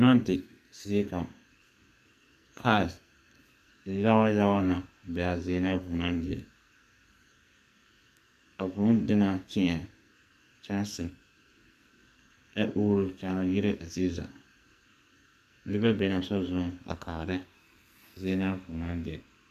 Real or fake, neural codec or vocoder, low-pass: fake; codec, 44.1 kHz, 2.6 kbps, SNAC; 14.4 kHz